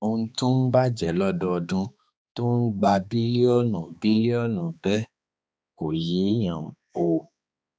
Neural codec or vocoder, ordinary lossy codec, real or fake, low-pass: codec, 16 kHz, 2 kbps, X-Codec, HuBERT features, trained on balanced general audio; none; fake; none